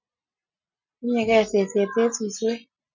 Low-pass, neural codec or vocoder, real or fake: 7.2 kHz; none; real